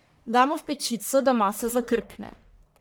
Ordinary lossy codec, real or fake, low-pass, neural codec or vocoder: none; fake; none; codec, 44.1 kHz, 1.7 kbps, Pupu-Codec